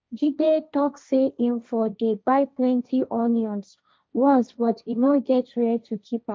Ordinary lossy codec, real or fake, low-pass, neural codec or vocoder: none; fake; none; codec, 16 kHz, 1.1 kbps, Voila-Tokenizer